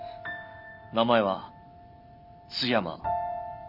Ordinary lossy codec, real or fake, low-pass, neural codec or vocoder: none; real; 5.4 kHz; none